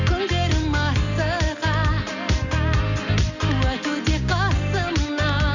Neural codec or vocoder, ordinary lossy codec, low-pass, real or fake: none; none; 7.2 kHz; real